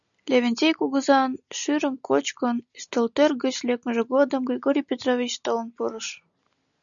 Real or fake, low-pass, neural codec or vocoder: real; 7.2 kHz; none